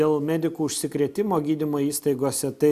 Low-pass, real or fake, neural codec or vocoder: 14.4 kHz; real; none